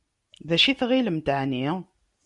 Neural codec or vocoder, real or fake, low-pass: none; real; 10.8 kHz